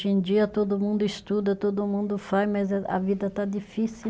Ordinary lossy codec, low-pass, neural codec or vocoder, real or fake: none; none; none; real